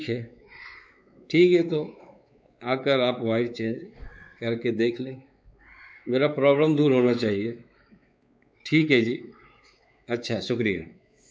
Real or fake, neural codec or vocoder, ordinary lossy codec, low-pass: fake; codec, 16 kHz, 4 kbps, X-Codec, WavLM features, trained on Multilingual LibriSpeech; none; none